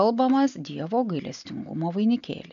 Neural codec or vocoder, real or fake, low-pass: none; real; 7.2 kHz